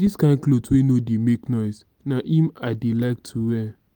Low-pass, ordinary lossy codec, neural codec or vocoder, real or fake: 19.8 kHz; Opus, 32 kbps; none; real